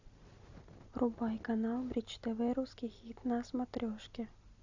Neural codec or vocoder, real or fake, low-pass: none; real; 7.2 kHz